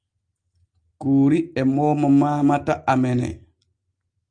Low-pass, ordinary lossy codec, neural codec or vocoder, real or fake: 9.9 kHz; Opus, 32 kbps; none; real